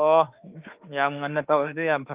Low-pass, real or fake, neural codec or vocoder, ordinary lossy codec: 3.6 kHz; fake; autoencoder, 48 kHz, 32 numbers a frame, DAC-VAE, trained on Japanese speech; Opus, 32 kbps